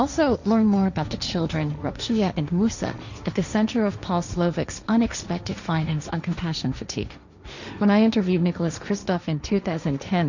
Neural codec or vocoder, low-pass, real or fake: codec, 16 kHz, 1.1 kbps, Voila-Tokenizer; 7.2 kHz; fake